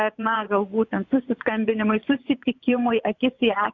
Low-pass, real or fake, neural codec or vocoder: 7.2 kHz; fake; vocoder, 24 kHz, 100 mel bands, Vocos